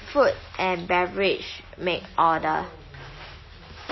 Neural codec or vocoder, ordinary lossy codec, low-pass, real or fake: none; MP3, 24 kbps; 7.2 kHz; real